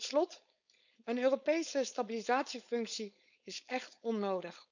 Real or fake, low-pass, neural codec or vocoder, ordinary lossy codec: fake; 7.2 kHz; codec, 16 kHz, 4.8 kbps, FACodec; none